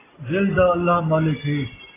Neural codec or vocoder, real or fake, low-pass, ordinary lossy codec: none; real; 3.6 kHz; AAC, 16 kbps